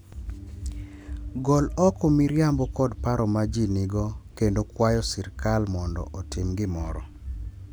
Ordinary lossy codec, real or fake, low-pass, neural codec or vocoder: none; real; none; none